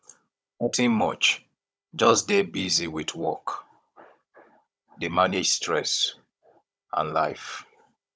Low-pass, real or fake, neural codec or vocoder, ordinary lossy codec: none; fake; codec, 16 kHz, 16 kbps, FunCodec, trained on Chinese and English, 50 frames a second; none